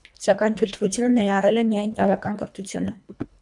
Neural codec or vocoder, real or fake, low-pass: codec, 24 kHz, 1.5 kbps, HILCodec; fake; 10.8 kHz